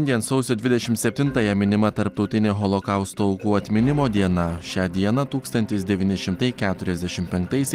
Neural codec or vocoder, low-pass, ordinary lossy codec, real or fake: none; 14.4 kHz; Opus, 24 kbps; real